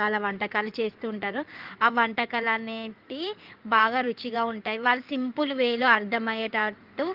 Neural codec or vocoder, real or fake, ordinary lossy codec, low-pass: codec, 16 kHz in and 24 kHz out, 2.2 kbps, FireRedTTS-2 codec; fake; Opus, 24 kbps; 5.4 kHz